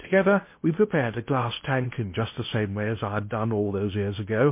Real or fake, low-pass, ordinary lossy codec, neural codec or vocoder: fake; 3.6 kHz; MP3, 24 kbps; codec, 16 kHz in and 24 kHz out, 0.8 kbps, FocalCodec, streaming, 65536 codes